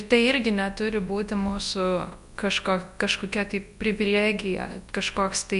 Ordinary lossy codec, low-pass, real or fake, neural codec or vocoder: MP3, 64 kbps; 10.8 kHz; fake; codec, 24 kHz, 0.9 kbps, WavTokenizer, large speech release